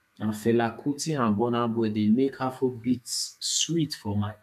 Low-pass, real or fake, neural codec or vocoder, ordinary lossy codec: 14.4 kHz; fake; codec, 32 kHz, 1.9 kbps, SNAC; none